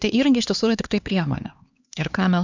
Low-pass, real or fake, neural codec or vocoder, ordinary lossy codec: 7.2 kHz; fake; codec, 16 kHz, 2 kbps, X-Codec, HuBERT features, trained on LibriSpeech; Opus, 64 kbps